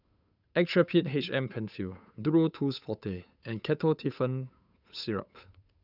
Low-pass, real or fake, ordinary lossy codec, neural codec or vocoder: 5.4 kHz; fake; none; codec, 16 kHz, 8 kbps, FunCodec, trained on Chinese and English, 25 frames a second